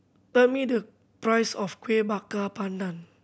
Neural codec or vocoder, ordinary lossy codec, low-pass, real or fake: none; none; none; real